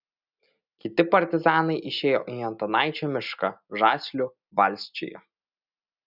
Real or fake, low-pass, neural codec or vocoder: real; 5.4 kHz; none